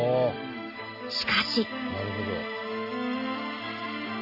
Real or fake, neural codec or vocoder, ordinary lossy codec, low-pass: real; none; Opus, 32 kbps; 5.4 kHz